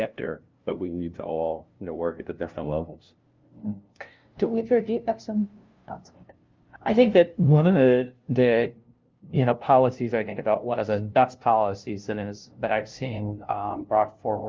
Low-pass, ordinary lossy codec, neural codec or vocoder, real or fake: 7.2 kHz; Opus, 24 kbps; codec, 16 kHz, 0.5 kbps, FunCodec, trained on LibriTTS, 25 frames a second; fake